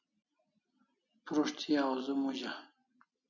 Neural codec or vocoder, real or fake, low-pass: none; real; 7.2 kHz